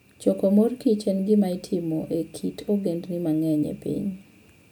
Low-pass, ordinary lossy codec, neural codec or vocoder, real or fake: none; none; none; real